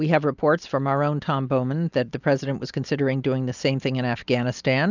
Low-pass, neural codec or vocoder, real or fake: 7.2 kHz; none; real